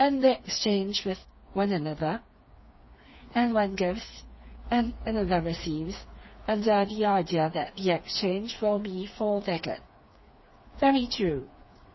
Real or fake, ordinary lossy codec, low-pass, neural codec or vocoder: fake; MP3, 24 kbps; 7.2 kHz; codec, 16 kHz, 4 kbps, FreqCodec, smaller model